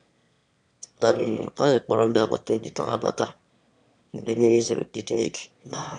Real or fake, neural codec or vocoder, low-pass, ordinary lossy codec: fake; autoencoder, 22.05 kHz, a latent of 192 numbers a frame, VITS, trained on one speaker; 9.9 kHz; none